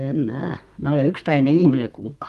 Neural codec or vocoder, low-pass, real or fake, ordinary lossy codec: codec, 32 kHz, 1.9 kbps, SNAC; 14.4 kHz; fake; Opus, 32 kbps